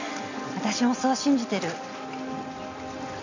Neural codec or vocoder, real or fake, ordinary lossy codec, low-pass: none; real; none; 7.2 kHz